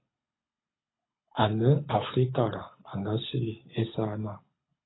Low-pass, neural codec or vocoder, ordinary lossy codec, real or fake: 7.2 kHz; codec, 24 kHz, 6 kbps, HILCodec; AAC, 16 kbps; fake